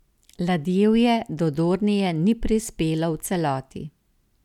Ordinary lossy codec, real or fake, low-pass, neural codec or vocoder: none; real; 19.8 kHz; none